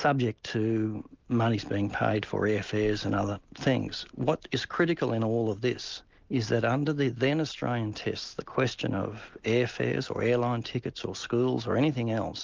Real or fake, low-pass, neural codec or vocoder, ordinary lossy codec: real; 7.2 kHz; none; Opus, 32 kbps